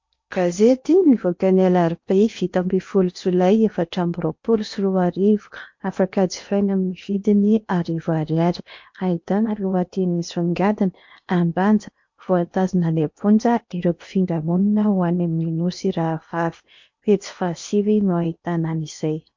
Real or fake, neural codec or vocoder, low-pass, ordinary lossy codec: fake; codec, 16 kHz in and 24 kHz out, 0.8 kbps, FocalCodec, streaming, 65536 codes; 7.2 kHz; MP3, 48 kbps